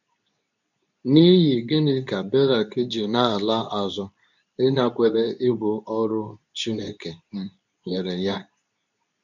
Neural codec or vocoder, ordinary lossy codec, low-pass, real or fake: codec, 24 kHz, 0.9 kbps, WavTokenizer, medium speech release version 2; none; 7.2 kHz; fake